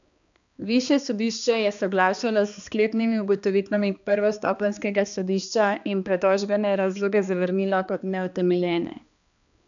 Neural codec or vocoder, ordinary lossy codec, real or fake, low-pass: codec, 16 kHz, 2 kbps, X-Codec, HuBERT features, trained on balanced general audio; none; fake; 7.2 kHz